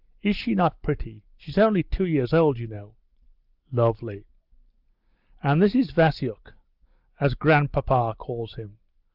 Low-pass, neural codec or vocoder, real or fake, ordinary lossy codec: 5.4 kHz; none; real; Opus, 32 kbps